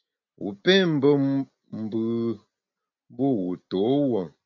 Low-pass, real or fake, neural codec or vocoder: 7.2 kHz; real; none